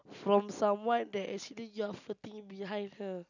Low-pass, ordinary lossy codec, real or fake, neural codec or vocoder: 7.2 kHz; none; real; none